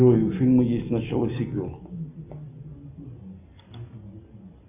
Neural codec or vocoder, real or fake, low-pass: none; real; 3.6 kHz